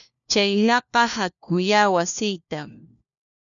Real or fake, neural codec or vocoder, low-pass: fake; codec, 16 kHz, 1 kbps, FunCodec, trained on LibriTTS, 50 frames a second; 7.2 kHz